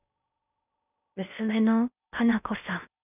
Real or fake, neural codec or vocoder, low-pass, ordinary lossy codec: fake; codec, 16 kHz in and 24 kHz out, 0.6 kbps, FocalCodec, streaming, 4096 codes; 3.6 kHz; none